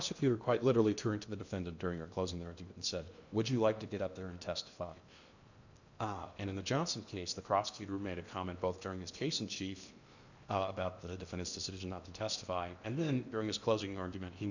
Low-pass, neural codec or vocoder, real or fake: 7.2 kHz; codec, 16 kHz in and 24 kHz out, 0.8 kbps, FocalCodec, streaming, 65536 codes; fake